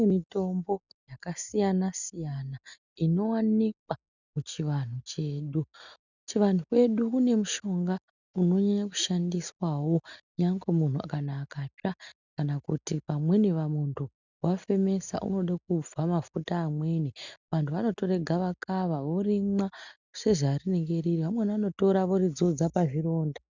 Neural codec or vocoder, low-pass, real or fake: none; 7.2 kHz; real